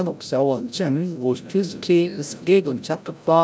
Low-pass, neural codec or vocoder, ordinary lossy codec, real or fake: none; codec, 16 kHz, 0.5 kbps, FreqCodec, larger model; none; fake